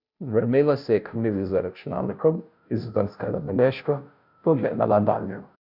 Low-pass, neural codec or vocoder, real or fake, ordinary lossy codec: 5.4 kHz; codec, 16 kHz, 0.5 kbps, FunCodec, trained on Chinese and English, 25 frames a second; fake; none